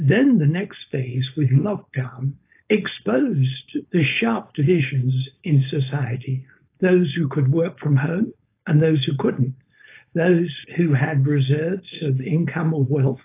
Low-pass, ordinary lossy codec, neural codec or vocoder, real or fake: 3.6 kHz; AAC, 24 kbps; codec, 16 kHz, 4.8 kbps, FACodec; fake